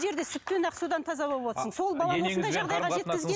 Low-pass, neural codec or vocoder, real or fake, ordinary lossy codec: none; none; real; none